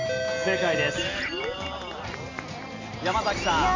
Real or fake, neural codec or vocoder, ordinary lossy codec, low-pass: real; none; AAC, 32 kbps; 7.2 kHz